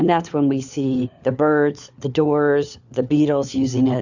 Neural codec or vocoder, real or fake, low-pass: codec, 16 kHz, 4 kbps, FunCodec, trained on LibriTTS, 50 frames a second; fake; 7.2 kHz